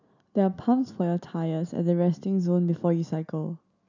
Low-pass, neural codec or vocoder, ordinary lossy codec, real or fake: 7.2 kHz; none; none; real